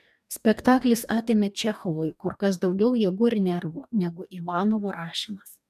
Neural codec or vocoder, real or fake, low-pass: codec, 44.1 kHz, 2.6 kbps, DAC; fake; 14.4 kHz